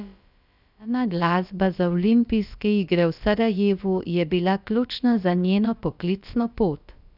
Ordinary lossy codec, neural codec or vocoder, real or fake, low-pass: none; codec, 16 kHz, about 1 kbps, DyCAST, with the encoder's durations; fake; 5.4 kHz